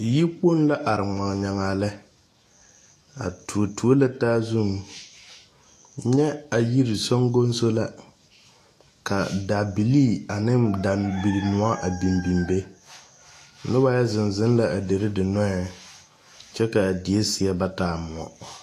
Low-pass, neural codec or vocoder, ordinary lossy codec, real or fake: 14.4 kHz; autoencoder, 48 kHz, 128 numbers a frame, DAC-VAE, trained on Japanese speech; AAC, 48 kbps; fake